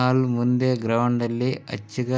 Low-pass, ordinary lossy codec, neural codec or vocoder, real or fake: none; none; none; real